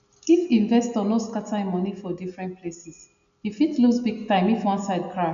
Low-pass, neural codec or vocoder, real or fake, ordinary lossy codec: 7.2 kHz; none; real; none